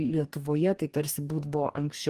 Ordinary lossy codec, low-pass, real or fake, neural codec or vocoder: Opus, 24 kbps; 14.4 kHz; fake; codec, 44.1 kHz, 2.6 kbps, DAC